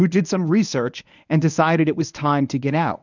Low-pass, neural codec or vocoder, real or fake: 7.2 kHz; codec, 24 kHz, 0.9 kbps, WavTokenizer, medium speech release version 1; fake